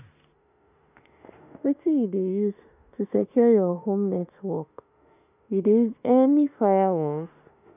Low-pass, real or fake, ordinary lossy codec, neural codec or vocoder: 3.6 kHz; fake; none; autoencoder, 48 kHz, 32 numbers a frame, DAC-VAE, trained on Japanese speech